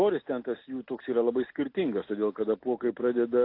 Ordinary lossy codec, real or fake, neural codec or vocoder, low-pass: AAC, 32 kbps; real; none; 5.4 kHz